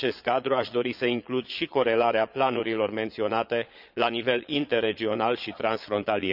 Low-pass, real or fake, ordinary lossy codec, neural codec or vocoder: 5.4 kHz; fake; none; vocoder, 22.05 kHz, 80 mel bands, Vocos